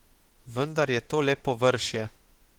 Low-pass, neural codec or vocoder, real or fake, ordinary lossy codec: 19.8 kHz; autoencoder, 48 kHz, 32 numbers a frame, DAC-VAE, trained on Japanese speech; fake; Opus, 16 kbps